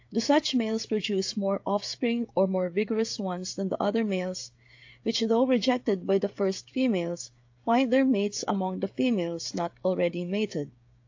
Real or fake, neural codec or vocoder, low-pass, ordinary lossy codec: fake; codec, 16 kHz, 16 kbps, FreqCodec, smaller model; 7.2 kHz; AAC, 48 kbps